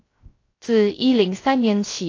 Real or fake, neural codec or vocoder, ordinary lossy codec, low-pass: fake; codec, 16 kHz, 0.3 kbps, FocalCodec; AAC, 32 kbps; 7.2 kHz